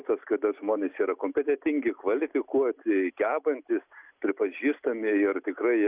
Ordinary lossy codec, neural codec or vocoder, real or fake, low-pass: Opus, 64 kbps; none; real; 3.6 kHz